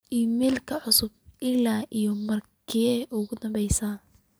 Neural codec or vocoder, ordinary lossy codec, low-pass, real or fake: none; none; none; real